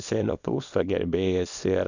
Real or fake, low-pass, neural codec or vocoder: fake; 7.2 kHz; codec, 24 kHz, 0.9 kbps, WavTokenizer, small release